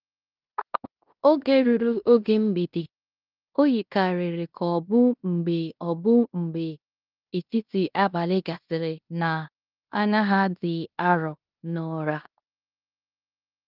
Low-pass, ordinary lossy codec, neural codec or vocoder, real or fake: 5.4 kHz; Opus, 32 kbps; codec, 16 kHz in and 24 kHz out, 0.9 kbps, LongCat-Audio-Codec, fine tuned four codebook decoder; fake